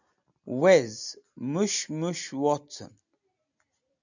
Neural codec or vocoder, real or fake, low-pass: none; real; 7.2 kHz